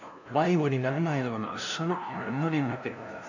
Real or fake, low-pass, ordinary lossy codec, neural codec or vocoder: fake; 7.2 kHz; none; codec, 16 kHz, 0.5 kbps, FunCodec, trained on LibriTTS, 25 frames a second